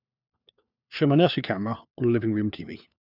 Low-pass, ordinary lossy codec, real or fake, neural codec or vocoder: 5.4 kHz; none; fake; codec, 16 kHz, 4 kbps, FunCodec, trained on LibriTTS, 50 frames a second